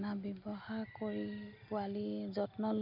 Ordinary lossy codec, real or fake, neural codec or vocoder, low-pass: none; real; none; 5.4 kHz